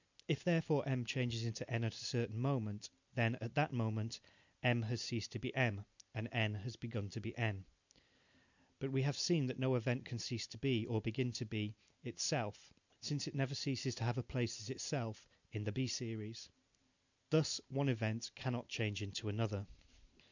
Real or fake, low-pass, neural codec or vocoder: real; 7.2 kHz; none